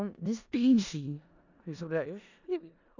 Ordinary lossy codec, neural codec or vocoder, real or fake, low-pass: none; codec, 16 kHz in and 24 kHz out, 0.4 kbps, LongCat-Audio-Codec, four codebook decoder; fake; 7.2 kHz